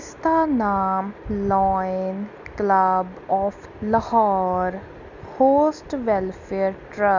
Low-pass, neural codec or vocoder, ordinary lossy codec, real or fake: 7.2 kHz; none; none; real